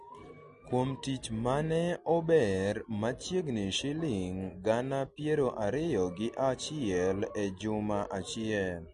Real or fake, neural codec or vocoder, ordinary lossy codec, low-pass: real; none; MP3, 48 kbps; 14.4 kHz